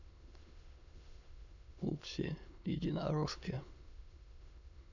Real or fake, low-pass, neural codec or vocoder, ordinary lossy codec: fake; 7.2 kHz; autoencoder, 22.05 kHz, a latent of 192 numbers a frame, VITS, trained on many speakers; none